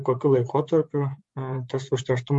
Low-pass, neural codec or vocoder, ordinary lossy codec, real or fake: 10.8 kHz; vocoder, 24 kHz, 100 mel bands, Vocos; MP3, 48 kbps; fake